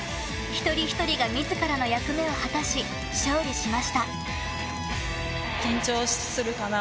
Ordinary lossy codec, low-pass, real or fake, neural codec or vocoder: none; none; real; none